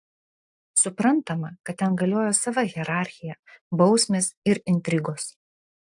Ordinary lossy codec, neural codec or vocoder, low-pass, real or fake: AAC, 64 kbps; none; 10.8 kHz; real